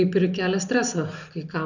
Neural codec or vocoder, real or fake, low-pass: none; real; 7.2 kHz